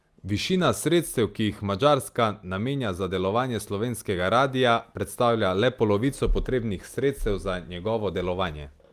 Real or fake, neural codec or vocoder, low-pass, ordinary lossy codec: real; none; 14.4 kHz; Opus, 32 kbps